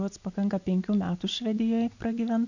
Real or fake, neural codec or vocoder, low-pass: real; none; 7.2 kHz